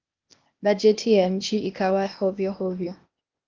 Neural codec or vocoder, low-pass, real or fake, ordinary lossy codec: codec, 16 kHz, 0.8 kbps, ZipCodec; 7.2 kHz; fake; Opus, 24 kbps